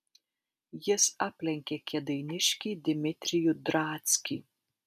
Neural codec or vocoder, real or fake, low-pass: none; real; 14.4 kHz